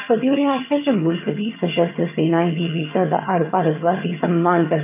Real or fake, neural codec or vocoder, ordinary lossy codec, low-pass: fake; vocoder, 22.05 kHz, 80 mel bands, HiFi-GAN; none; 3.6 kHz